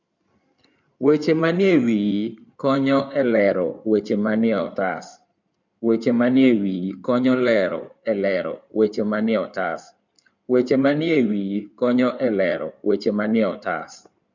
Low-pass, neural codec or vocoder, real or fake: 7.2 kHz; codec, 16 kHz in and 24 kHz out, 2.2 kbps, FireRedTTS-2 codec; fake